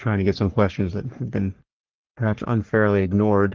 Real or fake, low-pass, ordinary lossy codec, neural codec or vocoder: fake; 7.2 kHz; Opus, 16 kbps; codec, 44.1 kHz, 3.4 kbps, Pupu-Codec